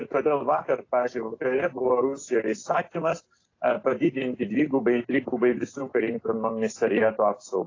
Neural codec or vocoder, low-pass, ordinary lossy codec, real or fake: none; 7.2 kHz; AAC, 32 kbps; real